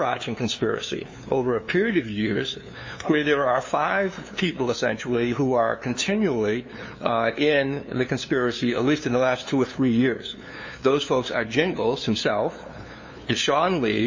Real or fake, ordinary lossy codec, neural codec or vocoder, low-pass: fake; MP3, 32 kbps; codec, 16 kHz, 2 kbps, FunCodec, trained on LibriTTS, 25 frames a second; 7.2 kHz